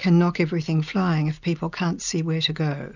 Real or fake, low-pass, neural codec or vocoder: real; 7.2 kHz; none